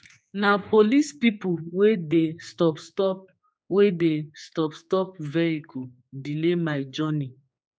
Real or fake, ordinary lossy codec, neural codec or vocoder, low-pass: fake; none; codec, 16 kHz, 4 kbps, X-Codec, HuBERT features, trained on general audio; none